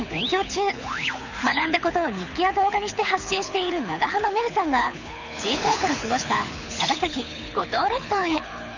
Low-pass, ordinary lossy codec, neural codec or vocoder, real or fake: 7.2 kHz; none; codec, 24 kHz, 6 kbps, HILCodec; fake